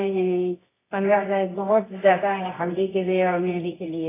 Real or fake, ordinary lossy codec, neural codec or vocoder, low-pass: fake; AAC, 16 kbps; codec, 24 kHz, 0.9 kbps, WavTokenizer, medium music audio release; 3.6 kHz